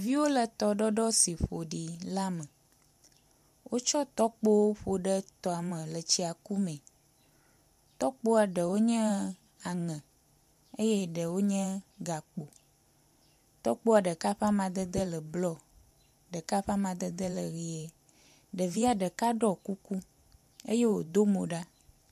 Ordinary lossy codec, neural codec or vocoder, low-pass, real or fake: MP3, 96 kbps; vocoder, 44.1 kHz, 128 mel bands every 512 samples, BigVGAN v2; 14.4 kHz; fake